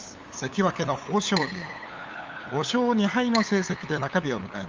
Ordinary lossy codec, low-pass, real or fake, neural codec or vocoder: Opus, 32 kbps; 7.2 kHz; fake; codec, 16 kHz, 8 kbps, FunCodec, trained on LibriTTS, 25 frames a second